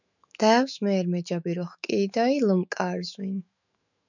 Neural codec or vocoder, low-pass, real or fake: autoencoder, 48 kHz, 128 numbers a frame, DAC-VAE, trained on Japanese speech; 7.2 kHz; fake